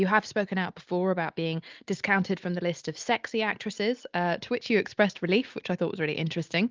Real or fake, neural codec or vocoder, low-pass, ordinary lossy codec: real; none; 7.2 kHz; Opus, 32 kbps